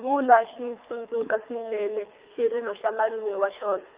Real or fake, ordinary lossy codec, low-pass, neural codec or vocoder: fake; Opus, 24 kbps; 3.6 kHz; codec, 24 kHz, 3 kbps, HILCodec